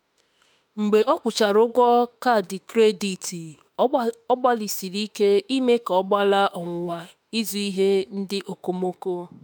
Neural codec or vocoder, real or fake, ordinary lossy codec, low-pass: autoencoder, 48 kHz, 32 numbers a frame, DAC-VAE, trained on Japanese speech; fake; none; none